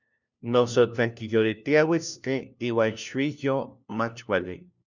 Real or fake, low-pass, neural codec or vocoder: fake; 7.2 kHz; codec, 16 kHz, 1 kbps, FunCodec, trained on LibriTTS, 50 frames a second